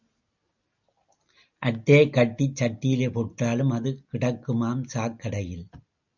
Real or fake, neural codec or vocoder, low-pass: real; none; 7.2 kHz